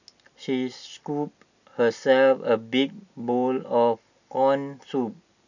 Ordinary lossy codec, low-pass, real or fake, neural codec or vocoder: none; 7.2 kHz; real; none